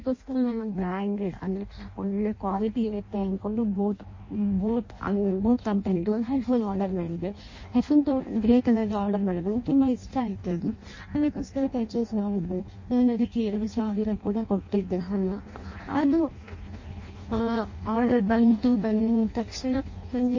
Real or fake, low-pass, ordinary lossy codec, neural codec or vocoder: fake; 7.2 kHz; MP3, 32 kbps; codec, 16 kHz in and 24 kHz out, 0.6 kbps, FireRedTTS-2 codec